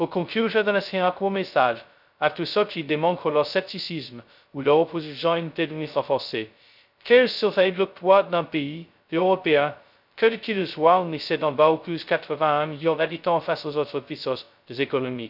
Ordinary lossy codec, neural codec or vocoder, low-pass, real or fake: none; codec, 16 kHz, 0.2 kbps, FocalCodec; 5.4 kHz; fake